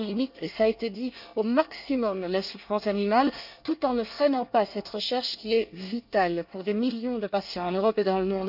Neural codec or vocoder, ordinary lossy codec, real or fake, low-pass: codec, 24 kHz, 1 kbps, SNAC; none; fake; 5.4 kHz